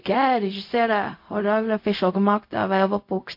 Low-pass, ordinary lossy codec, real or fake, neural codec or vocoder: 5.4 kHz; MP3, 32 kbps; fake; codec, 16 kHz, 0.4 kbps, LongCat-Audio-Codec